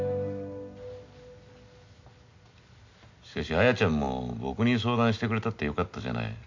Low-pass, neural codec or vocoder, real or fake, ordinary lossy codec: 7.2 kHz; none; real; MP3, 64 kbps